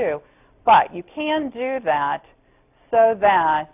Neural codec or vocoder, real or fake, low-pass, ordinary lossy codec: none; real; 3.6 kHz; AAC, 32 kbps